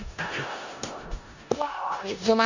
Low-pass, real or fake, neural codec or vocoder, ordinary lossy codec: 7.2 kHz; fake; codec, 16 kHz in and 24 kHz out, 0.4 kbps, LongCat-Audio-Codec, four codebook decoder; none